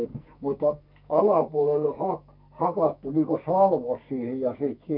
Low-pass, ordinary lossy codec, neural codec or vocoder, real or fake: 5.4 kHz; none; codec, 44.1 kHz, 2.6 kbps, SNAC; fake